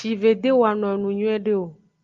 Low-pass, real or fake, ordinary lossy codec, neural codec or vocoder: 7.2 kHz; real; Opus, 32 kbps; none